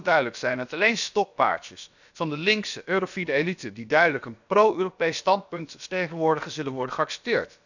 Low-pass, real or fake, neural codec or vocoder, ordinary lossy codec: 7.2 kHz; fake; codec, 16 kHz, about 1 kbps, DyCAST, with the encoder's durations; none